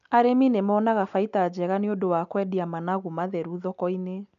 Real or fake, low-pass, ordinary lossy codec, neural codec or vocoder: real; 7.2 kHz; none; none